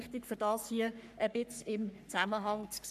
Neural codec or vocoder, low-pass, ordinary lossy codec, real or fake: codec, 44.1 kHz, 3.4 kbps, Pupu-Codec; 14.4 kHz; none; fake